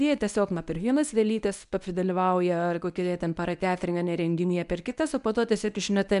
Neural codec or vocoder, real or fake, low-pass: codec, 24 kHz, 0.9 kbps, WavTokenizer, medium speech release version 1; fake; 10.8 kHz